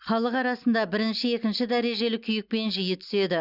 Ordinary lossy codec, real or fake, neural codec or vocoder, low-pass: Opus, 64 kbps; real; none; 5.4 kHz